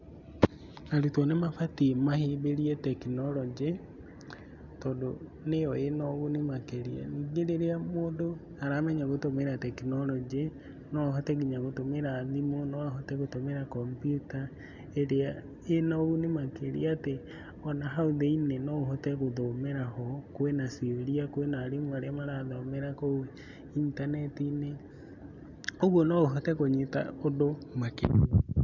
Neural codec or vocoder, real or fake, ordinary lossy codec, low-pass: none; real; none; 7.2 kHz